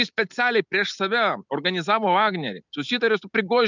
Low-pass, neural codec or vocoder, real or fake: 7.2 kHz; none; real